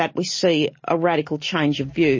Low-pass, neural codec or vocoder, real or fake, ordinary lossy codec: 7.2 kHz; none; real; MP3, 32 kbps